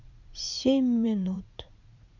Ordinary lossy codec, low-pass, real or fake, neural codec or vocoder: none; 7.2 kHz; fake; vocoder, 44.1 kHz, 80 mel bands, Vocos